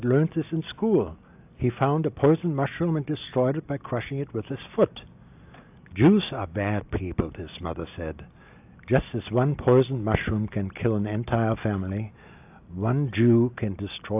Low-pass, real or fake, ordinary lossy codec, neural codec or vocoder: 3.6 kHz; real; AAC, 32 kbps; none